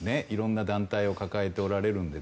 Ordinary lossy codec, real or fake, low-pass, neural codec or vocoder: none; real; none; none